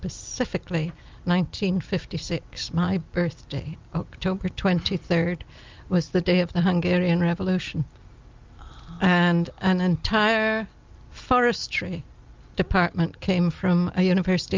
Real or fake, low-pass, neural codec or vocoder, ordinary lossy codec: real; 7.2 kHz; none; Opus, 24 kbps